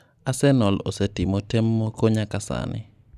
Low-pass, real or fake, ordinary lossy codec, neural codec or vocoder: 14.4 kHz; real; none; none